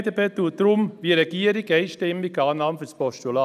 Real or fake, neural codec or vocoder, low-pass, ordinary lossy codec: real; none; 14.4 kHz; none